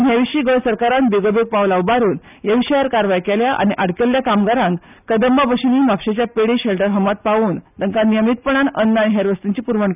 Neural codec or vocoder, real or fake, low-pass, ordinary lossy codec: none; real; 3.6 kHz; none